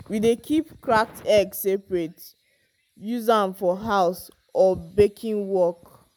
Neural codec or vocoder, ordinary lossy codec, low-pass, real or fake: none; none; none; real